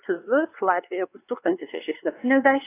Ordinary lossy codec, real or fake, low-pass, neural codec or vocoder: AAC, 24 kbps; fake; 3.6 kHz; codec, 16 kHz, 2 kbps, X-Codec, WavLM features, trained on Multilingual LibriSpeech